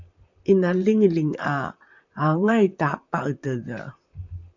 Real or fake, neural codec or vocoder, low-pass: fake; vocoder, 44.1 kHz, 128 mel bands, Pupu-Vocoder; 7.2 kHz